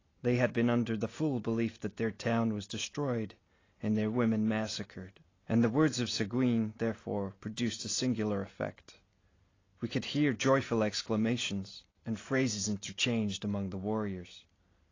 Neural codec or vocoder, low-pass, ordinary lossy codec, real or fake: none; 7.2 kHz; AAC, 32 kbps; real